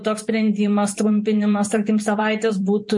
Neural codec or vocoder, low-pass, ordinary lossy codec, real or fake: vocoder, 44.1 kHz, 128 mel bands every 512 samples, BigVGAN v2; 10.8 kHz; MP3, 48 kbps; fake